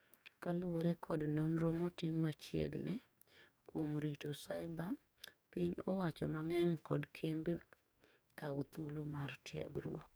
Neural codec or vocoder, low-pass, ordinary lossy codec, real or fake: codec, 44.1 kHz, 2.6 kbps, DAC; none; none; fake